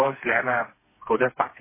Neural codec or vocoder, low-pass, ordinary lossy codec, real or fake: codec, 16 kHz, 2 kbps, FreqCodec, smaller model; 3.6 kHz; MP3, 16 kbps; fake